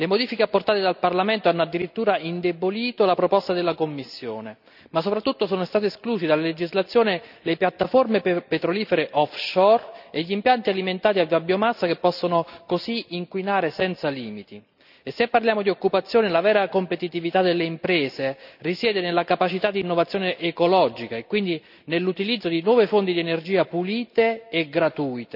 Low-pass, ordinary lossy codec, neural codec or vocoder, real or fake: 5.4 kHz; none; none; real